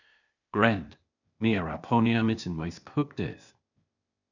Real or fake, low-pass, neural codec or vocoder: fake; 7.2 kHz; codec, 16 kHz, 0.8 kbps, ZipCodec